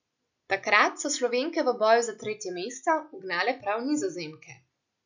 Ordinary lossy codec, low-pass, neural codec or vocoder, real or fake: none; 7.2 kHz; none; real